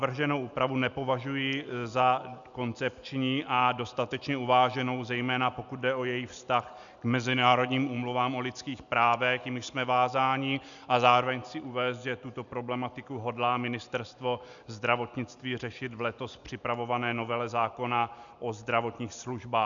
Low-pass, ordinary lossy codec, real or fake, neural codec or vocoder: 7.2 kHz; MP3, 96 kbps; real; none